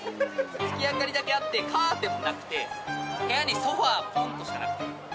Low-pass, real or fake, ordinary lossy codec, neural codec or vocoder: none; real; none; none